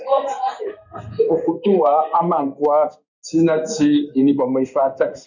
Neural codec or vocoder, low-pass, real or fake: codec, 16 kHz in and 24 kHz out, 1 kbps, XY-Tokenizer; 7.2 kHz; fake